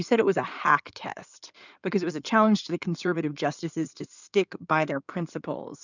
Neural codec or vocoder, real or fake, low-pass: codec, 16 kHz in and 24 kHz out, 2.2 kbps, FireRedTTS-2 codec; fake; 7.2 kHz